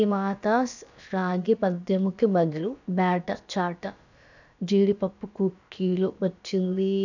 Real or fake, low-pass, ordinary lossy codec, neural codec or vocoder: fake; 7.2 kHz; none; codec, 16 kHz, about 1 kbps, DyCAST, with the encoder's durations